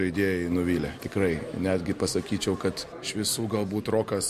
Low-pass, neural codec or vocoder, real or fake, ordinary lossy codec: 14.4 kHz; none; real; MP3, 64 kbps